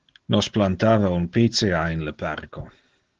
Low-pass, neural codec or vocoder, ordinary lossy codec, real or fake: 7.2 kHz; none; Opus, 16 kbps; real